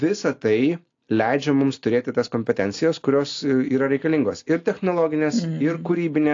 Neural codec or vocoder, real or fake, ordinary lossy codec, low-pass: none; real; AAC, 48 kbps; 7.2 kHz